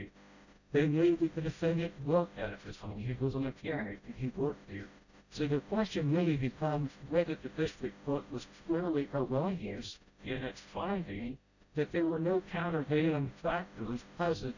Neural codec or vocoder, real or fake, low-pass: codec, 16 kHz, 0.5 kbps, FreqCodec, smaller model; fake; 7.2 kHz